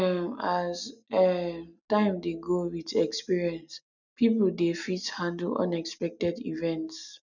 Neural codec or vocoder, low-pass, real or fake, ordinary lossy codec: none; 7.2 kHz; real; none